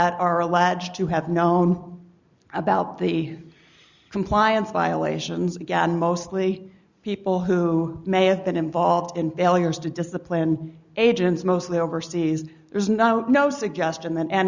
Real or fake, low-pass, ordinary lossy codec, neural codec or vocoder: real; 7.2 kHz; Opus, 64 kbps; none